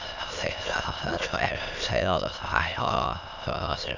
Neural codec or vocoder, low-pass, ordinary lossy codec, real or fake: autoencoder, 22.05 kHz, a latent of 192 numbers a frame, VITS, trained on many speakers; 7.2 kHz; none; fake